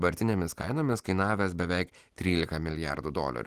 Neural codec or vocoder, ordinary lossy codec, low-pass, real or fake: none; Opus, 24 kbps; 14.4 kHz; real